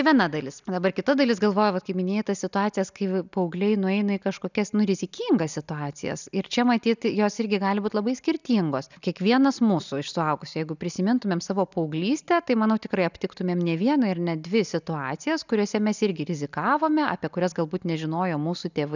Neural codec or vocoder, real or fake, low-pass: none; real; 7.2 kHz